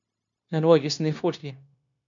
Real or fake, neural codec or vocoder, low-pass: fake; codec, 16 kHz, 0.9 kbps, LongCat-Audio-Codec; 7.2 kHz